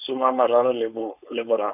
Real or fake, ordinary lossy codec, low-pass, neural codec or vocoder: fake; none; 3.6 kHz; codec, 16 kHz, 4.8 kbps, FACodec